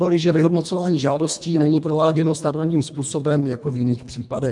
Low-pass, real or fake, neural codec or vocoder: 10.8 kHz; fake; codec, 24 kHz, 1.5 kbps, HILCodec